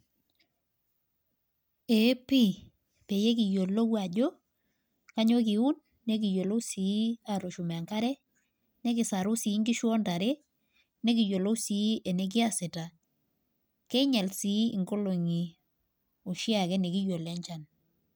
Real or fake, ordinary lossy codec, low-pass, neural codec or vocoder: real; none; none; none